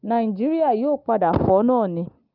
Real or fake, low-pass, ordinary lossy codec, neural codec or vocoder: real; 5.4 kHz; Opus, 24 kbps; none